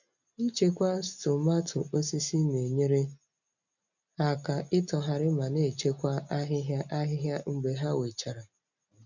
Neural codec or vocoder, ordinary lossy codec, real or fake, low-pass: none; none; real; 7.2 kHz